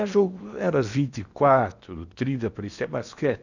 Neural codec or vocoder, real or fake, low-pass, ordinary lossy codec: codec, 16 kHz in and 24 kHz out, 0.8 kbps, FocalCodec, streaming, 65536 codes; fake; 7.2 kHz; none